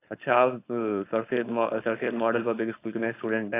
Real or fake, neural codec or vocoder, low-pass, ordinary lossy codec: fake; codec, 16 kHz, 4.8 kbps, FACodec; 3.6 kHz; AAC, 24 kbps